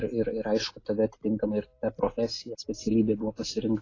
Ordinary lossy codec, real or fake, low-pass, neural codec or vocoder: AAC, 32 kbps; real; 7.2 kHz; none